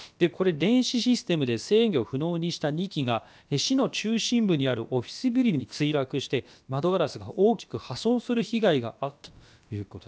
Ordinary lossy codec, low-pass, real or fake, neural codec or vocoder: none; none; fake; codec, 16 kHz, about 1 kbps, DyCAST, with the encoder's durations